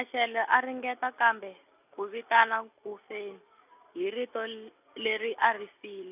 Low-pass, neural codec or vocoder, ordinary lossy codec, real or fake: 3.6 kHz; none; none; real